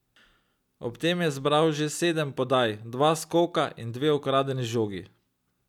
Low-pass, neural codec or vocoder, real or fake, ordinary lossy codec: 19.8 kHz; none; real; none